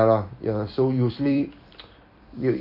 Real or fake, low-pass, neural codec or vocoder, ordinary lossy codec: fake; 5.4 kHz; vocoder, 44.1 kHz, 128 mel bands every 256 samples, BigVGAN v2; none